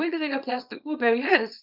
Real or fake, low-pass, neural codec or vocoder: fake; 5.4 kHz; codec, 24 kHz, 0.9 kbps, WavTokenizer, small release